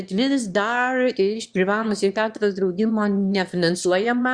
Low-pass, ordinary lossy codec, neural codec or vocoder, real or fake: 9.9 kHz; Opus, 64 kbps; autoencoder, 22.05 kHz, a latent of 192 numbers a frame, VITS, trained on one speaker; fake